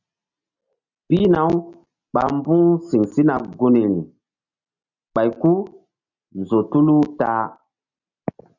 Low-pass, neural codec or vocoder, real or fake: 7.2 kHz; none; real